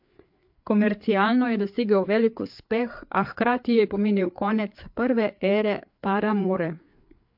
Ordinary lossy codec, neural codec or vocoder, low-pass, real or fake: MP3, 48 kbps; codec, 16 kHz, 4 kbps, FreqCodec, larger model; 5.4 kHz; fake